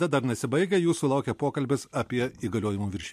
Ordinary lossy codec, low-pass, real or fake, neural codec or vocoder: MP3, 64 kbps; 14.4 kHz; real; none